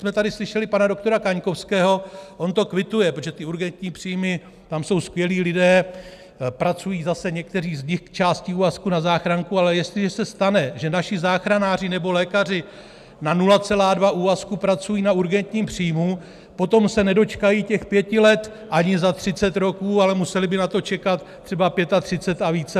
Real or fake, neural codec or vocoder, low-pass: real; none; 14.4 kHz